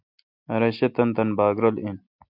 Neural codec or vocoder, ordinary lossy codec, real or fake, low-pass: none; MP3, 48 kbps; real; 5.4 kHz